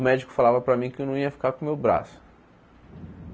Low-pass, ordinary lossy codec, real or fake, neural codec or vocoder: none; none; real; none